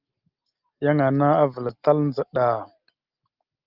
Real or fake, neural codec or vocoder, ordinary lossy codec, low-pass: real; none; Opus, 32 kbps; 5.4 kHz